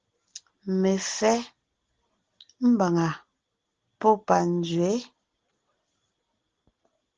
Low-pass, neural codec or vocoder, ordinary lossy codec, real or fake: 7.2 kHz; none; Opus, 16 kbps; real